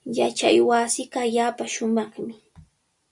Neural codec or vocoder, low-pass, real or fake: none; 10.8 kHz; real